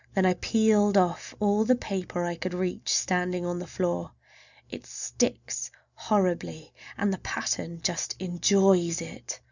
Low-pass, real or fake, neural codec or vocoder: 7.2 kHz; real; none